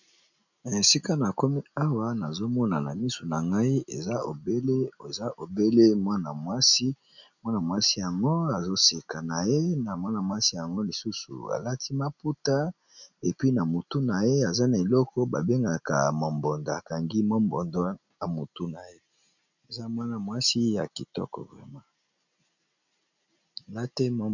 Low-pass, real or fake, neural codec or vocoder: 7.2 kHz; real; none